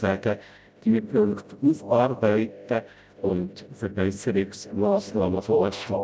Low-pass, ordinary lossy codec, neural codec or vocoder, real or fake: none; none; codec, 16 kHz, 0.5 kbps, FreqCodec, smaller model; fake